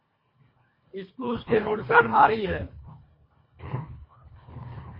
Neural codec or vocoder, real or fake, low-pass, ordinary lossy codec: codec, 24 kHz, 1.5 kbps, HILCodec; fake; 5.4 kHz; MP3, 24 kbps